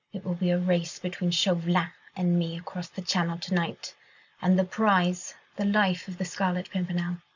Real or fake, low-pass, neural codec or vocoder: real; 7.2 kHz; none